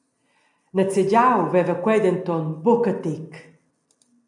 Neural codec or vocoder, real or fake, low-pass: none; real; 10.8 kHz